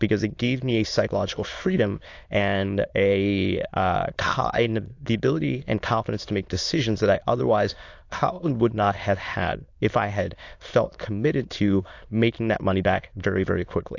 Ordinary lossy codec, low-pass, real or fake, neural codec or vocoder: AAC, 48 kbps; 7.2 kHz; fake; autoencoder, 22.05 kHz, a latent of 192 numbers a frame, VITS, trained on many speakers